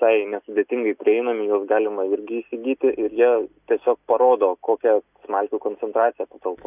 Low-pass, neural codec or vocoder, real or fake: 3.6 kHz; none; real